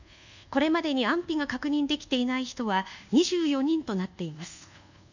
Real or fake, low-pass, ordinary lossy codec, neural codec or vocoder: fake; 7.2 kHz; none; codec, 24 kHz, 1.2 kbps, DualCodec